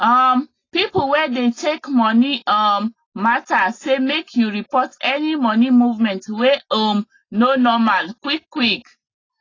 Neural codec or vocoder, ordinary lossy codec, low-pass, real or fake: none; AAC, 32 kbps; 7.2 kHz; real